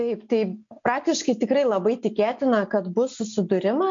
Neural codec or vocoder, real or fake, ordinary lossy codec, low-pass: none; real; MP3, 48 kbps; 7.2 kHz